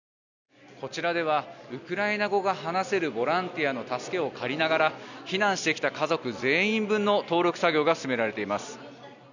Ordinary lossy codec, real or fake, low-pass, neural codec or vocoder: none; real; 7.2 kHz; none